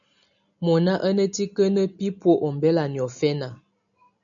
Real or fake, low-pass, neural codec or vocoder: real; 7.2 kHz; none